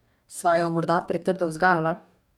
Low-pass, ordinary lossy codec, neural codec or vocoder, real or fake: 19.8 kHz; none; codec, 44.1 kHz, 2.6 kbps, DAC; fake